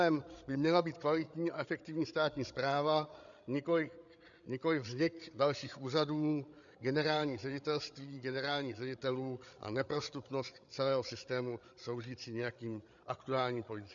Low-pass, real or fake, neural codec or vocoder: 7.2 kHz; fake; codec, 16 kHz, 8 kbps, FreqCodec, larger model